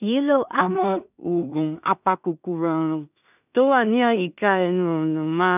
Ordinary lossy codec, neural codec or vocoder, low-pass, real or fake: none; codec, 16 kHz in and 24 kHz out, 0.4 kbps, LongCat-Audio-Codec, two codebook decoder; 3.6 kHz; fake